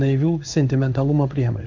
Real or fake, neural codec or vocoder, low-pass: fake; codec, 16 kHz in and 24 kHz out, 1 kbps, XY-Tokenizer; 7.2 kHz